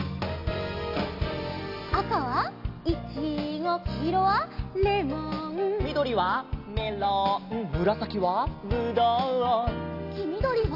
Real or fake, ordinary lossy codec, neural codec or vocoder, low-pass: real; AAC, 32 kbps; none; 5.4 kHz